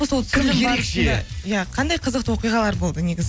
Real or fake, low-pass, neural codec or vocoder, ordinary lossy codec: real; none; none; none